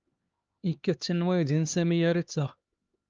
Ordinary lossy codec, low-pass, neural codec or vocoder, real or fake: Opus, 24 kbps; 7.2 kHz; codec, 16 kHz, 2 kbps, X-Codec, HuBERT features, trained on LibriSpeech; fake